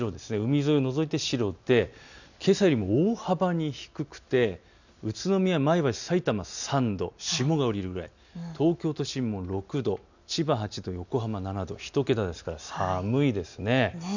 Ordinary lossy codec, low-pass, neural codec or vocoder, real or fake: none; 7.2 kHz; none; real